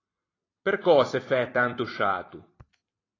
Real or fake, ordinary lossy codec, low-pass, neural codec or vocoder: fake; AAC, 32 kbps; 7.2 kHz; vocoder, 44.1 kHz, 128 mel bands every 256 samples, BigVGAN v2